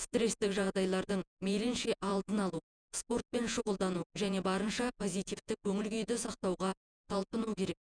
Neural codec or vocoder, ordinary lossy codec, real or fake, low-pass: vocoder, 48 kHz, 128 mel bands, Vocos; Opus, 64 kbps; fake; 9.9 kHz